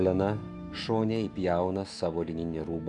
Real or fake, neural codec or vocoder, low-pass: fake; autoencoder, 48 kHz, 128 numbers a frame, DAC-VAE, trained on Japanese speech; 10.8 kHz